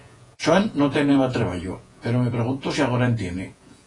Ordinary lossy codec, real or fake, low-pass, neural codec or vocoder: AAC, 32 kbps; fake; 10.8 kHz; vocoder, 48 kHz, 128 mel bands, Vocos